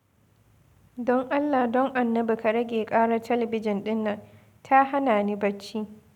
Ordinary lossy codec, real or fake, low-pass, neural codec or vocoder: none; real; 19.8 kHz; none